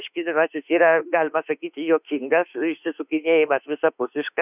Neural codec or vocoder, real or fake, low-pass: autoencoder, 48 kHz, 32 numbers a frame, DAC-VAE, trained on Japanese speech; fake; 3.6 kHz